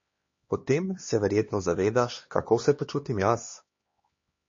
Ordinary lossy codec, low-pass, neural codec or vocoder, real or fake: MP3, 32 kbps; 7.2 kHz; codec, 16 kHz, 2 kbps, X-Codec, HuBERT features, trained on LibriSpeech; fake